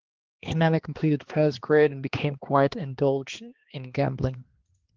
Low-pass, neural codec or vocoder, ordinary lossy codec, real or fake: 7.2 kHz; codec, 16 kHz, 2 kbps, X-Codec, HuBERT features, trained on balanced general audio; Opus, 16 kbps; fake